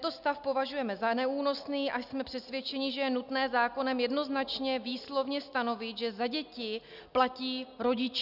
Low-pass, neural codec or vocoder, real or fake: 5.4 kHz; none; real